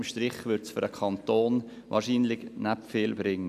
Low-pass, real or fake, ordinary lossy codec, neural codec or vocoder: 14.4 kHz; real; none; none